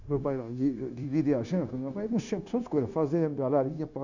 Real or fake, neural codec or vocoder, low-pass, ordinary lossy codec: fake; codec, 16 kHz, 0.9 kbps, LongCat-Audio-Codec; 7.2 kHz; none